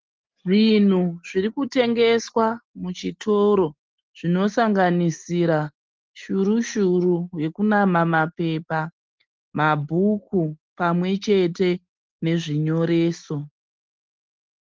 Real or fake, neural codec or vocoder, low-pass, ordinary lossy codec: real; none; 7.2 kHz; Opus, 16 kbps